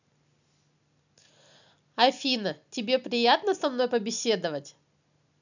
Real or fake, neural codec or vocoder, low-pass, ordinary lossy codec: real; none; 7.2 kHz; none